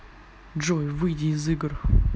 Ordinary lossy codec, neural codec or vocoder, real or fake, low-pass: none; none; real; none